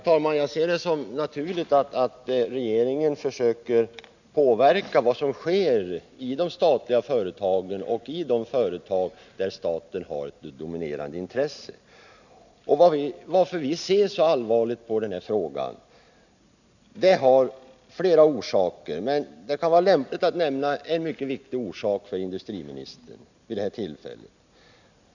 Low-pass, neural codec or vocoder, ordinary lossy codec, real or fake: 7.2 kHz; none; none; real